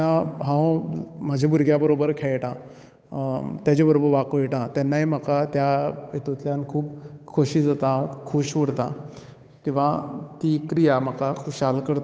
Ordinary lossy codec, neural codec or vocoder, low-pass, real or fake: none; codec, 16 kHz, 8 kbps, FunCodec, trained on Chinese and English, 25 frames a second; none; fake